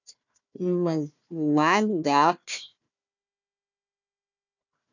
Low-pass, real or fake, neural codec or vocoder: 7.2 kHz; fake; codec, 16 kHz, 1 kbps, FunCodec, trained on Chinese and English, 50 frames a second